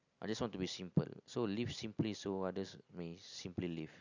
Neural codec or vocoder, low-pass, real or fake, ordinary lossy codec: none; 7.2 kHz; real; none